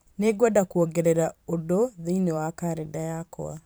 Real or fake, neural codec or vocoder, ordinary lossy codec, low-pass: fake; vocoder, 44.1 kHz, 128 mel bands every 512 samples, BigVGAN v2; none; none